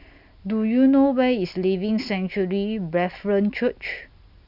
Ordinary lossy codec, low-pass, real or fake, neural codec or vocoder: none; 5.4 kHz; real; none